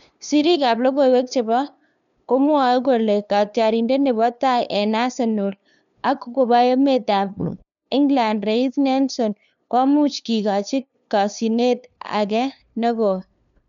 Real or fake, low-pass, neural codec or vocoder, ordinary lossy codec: fake; 7.2 kHz; codec, 16 kHz, 2 kbps, FunCodec, trained on LibriTTS, 25 frames a second; none